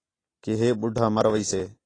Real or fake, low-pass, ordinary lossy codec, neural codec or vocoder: real; 9.9 kHz; AAC, 32 kbps; none